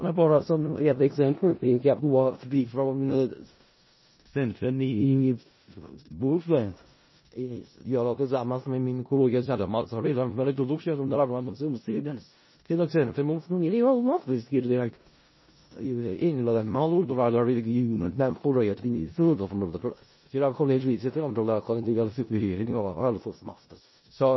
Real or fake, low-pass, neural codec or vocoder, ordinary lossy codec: fake; 7.2 kHz; codec, 16 kHz in and 24 kHz out, 0.4 kbps, LongCat-Audio-Codec, four codebook decoder; MP3, 24 kbps